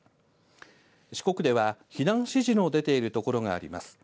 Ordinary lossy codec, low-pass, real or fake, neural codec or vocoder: none; none; real; none